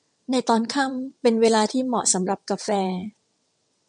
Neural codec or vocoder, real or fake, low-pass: vocoder, 22.05 kHz, 80 mel bands, WaveNeXt; fake; 9.9 kHz